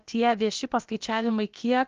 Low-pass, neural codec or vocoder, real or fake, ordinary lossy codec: 7.2 kHz; codec, 16 kHz, about 1 kbps, DyCAST, with the encoder's durations; fake; Opus, 32 kbps